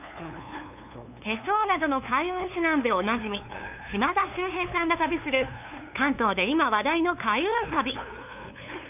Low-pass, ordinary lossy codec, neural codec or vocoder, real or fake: 3.6 kHz; none; codec, 16 kHz, 2 kbps, FunCodec, trained on LibriTTS, 25 frames a second; fake